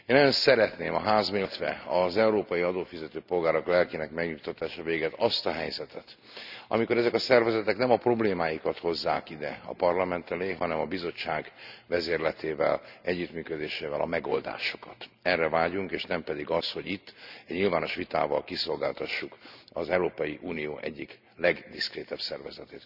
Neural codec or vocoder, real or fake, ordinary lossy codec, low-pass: none; real; none; 5.4 kHz